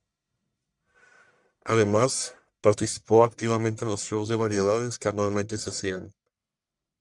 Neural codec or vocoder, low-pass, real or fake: codec, 44.1 kHz, 1.7 kbps, Pupu-Codec; 10.8 kHz; fake